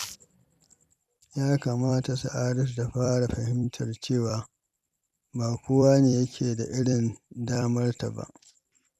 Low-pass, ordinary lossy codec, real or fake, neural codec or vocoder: 14.4 kHz; none; fake; vocoder, 44.1 kHz, 128 mel bands every 256 samples, BigVGAN v2